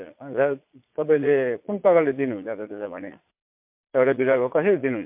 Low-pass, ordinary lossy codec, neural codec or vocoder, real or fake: 3.6 kHz; MP3, 32 kbps; vocoder, 22.05 kHz, 80 mel bands, Vocos; fake